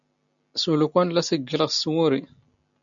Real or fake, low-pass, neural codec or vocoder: real; 7.2 kHz; none